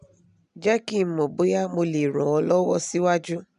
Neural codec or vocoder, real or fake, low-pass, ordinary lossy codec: none; real; 10.8 kHz; none